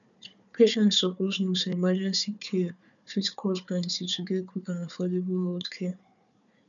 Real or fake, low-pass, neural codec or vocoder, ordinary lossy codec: fake; 7.2 kHz; codec, 16 kHz, 4 kbps, FunCodec, trained on Chinese and English, 50 frames a second; none